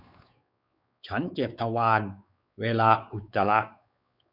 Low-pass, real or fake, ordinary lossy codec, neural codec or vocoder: 5.4 kHz; fake; none; codec, 16 kHz, 4 kbps, X-Codec, WavLM features, trained on Multilingual LibriSpeech